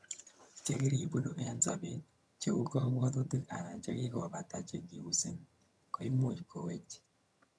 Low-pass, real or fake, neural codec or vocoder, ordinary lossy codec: none; fake; vocoder, 22.05 kHz, 80 mel bands, HiFi-GAN; none